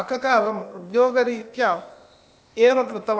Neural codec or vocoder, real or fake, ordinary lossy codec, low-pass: codec, 16 kHz, 0.8 kbps, ZipCodec; fake; none; none